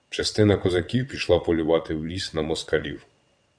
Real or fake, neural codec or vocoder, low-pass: fake; vocoder, 22.05 kHz, 80 mel bands, WaveNeXt; 9.9 kHz